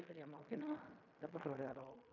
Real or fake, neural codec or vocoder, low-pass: fake; codec, 16 kHz in and 24 kHz out, 0.4 kbps, LongCat-Audio-Codec, fine tuned four codebook decoder; 7.2 kHz